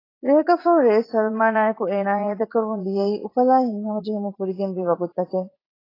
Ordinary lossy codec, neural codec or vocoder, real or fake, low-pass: AAC, 24 kbps; vocoder, 44.1 kHz, 80 mel bands, Vocos; fake; 5.4 kHz